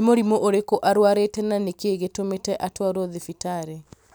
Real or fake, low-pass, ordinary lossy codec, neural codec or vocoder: real; none; none; none